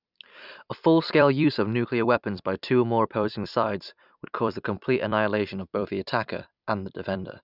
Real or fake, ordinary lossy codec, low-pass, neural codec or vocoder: fake; none; 5.4 kHz; vocoder, 44.1 kHz, 128 mel bands every 256 samples, BigVGAN v2